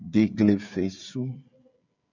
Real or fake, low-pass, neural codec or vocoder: fake; 7.2 kHz; codec, 16 kHz, 16 kbps, FreqCodec, smaller model